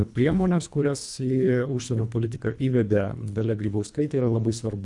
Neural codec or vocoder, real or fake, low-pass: codec, 24 kHz, 1.5 kbps, HILCodec; fake; 10.8 kHz